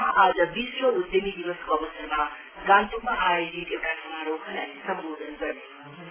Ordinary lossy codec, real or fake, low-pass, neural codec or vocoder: AAC, 16 kbps; real; 3.6 kHz; none